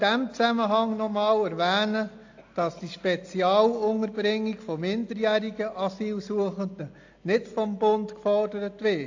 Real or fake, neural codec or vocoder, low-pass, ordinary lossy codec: real; none; 7.2 kHz; AAC, 48 kbps